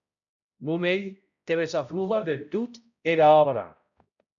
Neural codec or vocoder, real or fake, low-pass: codec, 16 kHz, 0.5 kbps, X-Codec, HuBERT features, trained on balanced general audio; fake; 7.2 kHz